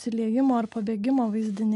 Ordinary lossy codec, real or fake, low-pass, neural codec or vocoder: AAC, 64 kbps; real; 10.8 kHz; none